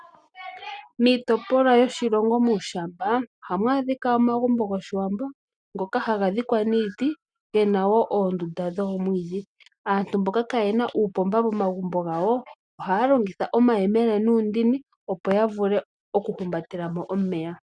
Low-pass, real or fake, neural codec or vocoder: 9.9 kHz; real; none